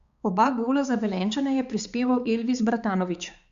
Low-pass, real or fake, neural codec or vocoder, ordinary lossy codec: 7.2 kHz; fake; codec, 16 kHz, 4 kbps, X-Codec, HuBERT features, trained on balanced general audio; Opus, 64 kbps